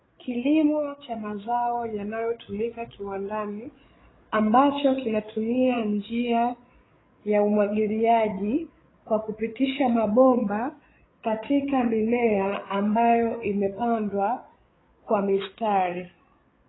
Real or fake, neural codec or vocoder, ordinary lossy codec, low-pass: fake; codec, 16 kHz, 8 kbps, FreqCodec, larger model; AAC, 16 kbps; 7.2 kHz